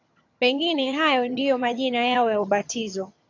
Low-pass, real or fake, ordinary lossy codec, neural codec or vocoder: 7.2 kHz; fake; AAC, 48 kbps; vocoder, 22.05 kHz, 80 mel bands, HiFi-GAN